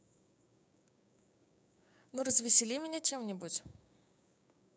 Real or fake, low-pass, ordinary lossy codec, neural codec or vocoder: fake; none; none; codec, 16 kHz, 6 kbps, DAC